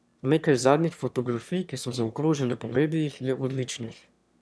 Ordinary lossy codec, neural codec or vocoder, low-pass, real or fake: none; autoencoder, 22.05 kHz, a latent of 192 numbers a frame, VITS, trained on one speaker; none; fake